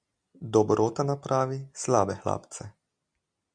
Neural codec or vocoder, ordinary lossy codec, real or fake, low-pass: none; Opus, 64 kbps; real; 9.9 kHz